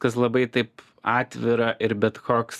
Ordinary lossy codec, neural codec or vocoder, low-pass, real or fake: Opus, 64 kbps; none; 14.4 kHz; real